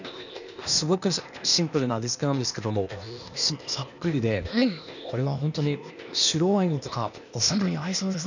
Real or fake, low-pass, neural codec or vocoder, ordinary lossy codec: fake; 7.2 kHz; codec, 16 kHz, 0.8 kbps, ZipCodec; none